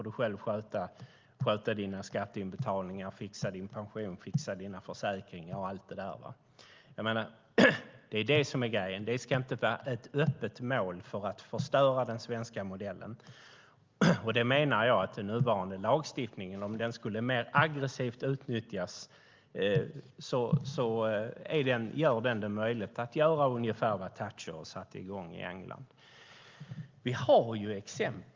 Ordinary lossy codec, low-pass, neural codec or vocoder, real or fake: Opus, 32 kbps; 7.2 kHz; none; real